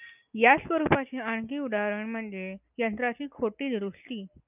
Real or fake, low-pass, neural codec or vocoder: real; 3.6 kHz; none